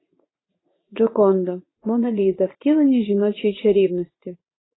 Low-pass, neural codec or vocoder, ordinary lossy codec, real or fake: 7.2 kHz; vocoder, 44.1 kHz, 80 mel bands, Vocos; AAC, 16 kbps; fake